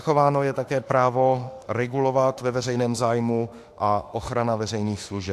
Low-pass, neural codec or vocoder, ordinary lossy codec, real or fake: 14.4 kHz; autoencoder, 48 kHz, 32 numbers a frame, DAC-VAE, trained on Japanese speech; AAC, 64 kbps; fake